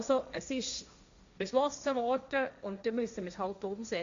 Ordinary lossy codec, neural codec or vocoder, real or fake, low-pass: MP3, 64 kbps; codec, 16 kHz, 1.1 kbps, Voila-Tokenizer; fake; 7.2 kHz